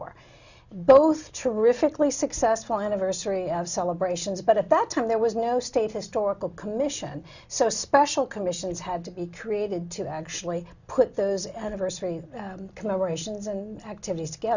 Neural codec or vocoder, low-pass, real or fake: none; 7.2 kHz; real